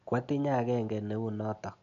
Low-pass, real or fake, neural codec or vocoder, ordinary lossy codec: 7.2 kHz; real; none; none